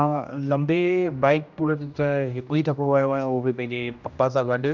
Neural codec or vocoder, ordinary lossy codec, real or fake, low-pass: codec, 16 kHz, 1 kbps, X-Codec, HuBERT features, trained on general audio; none; fake; 7.2 kHz